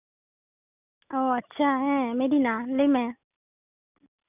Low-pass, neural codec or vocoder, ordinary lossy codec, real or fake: 3.6 kHz; none; none; real